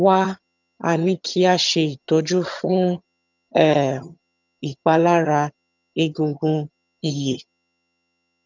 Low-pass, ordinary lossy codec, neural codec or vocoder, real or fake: 7.2 kHz; none; vocoder, 22.05 kHz, 80 mel bands, HiFi-GAN; fake